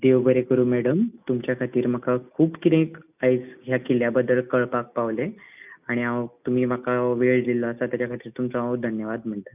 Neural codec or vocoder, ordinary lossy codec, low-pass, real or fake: none; none; 3.6 kHz; real